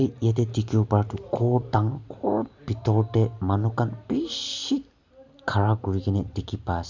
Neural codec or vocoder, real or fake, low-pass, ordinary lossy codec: none; real; 7.2 kHz; none